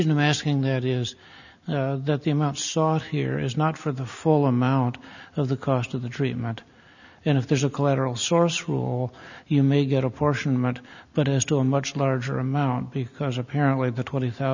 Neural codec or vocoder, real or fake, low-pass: none; real; 7.2 kHz